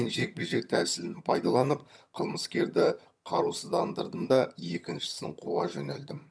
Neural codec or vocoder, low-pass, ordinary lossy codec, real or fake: vocoder, 22.05 kHz, 80 mel bands, HiFi-GAN; none; none; fake